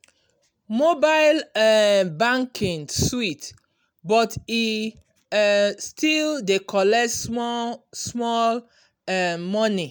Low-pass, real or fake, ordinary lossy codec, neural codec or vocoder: none; real; none; none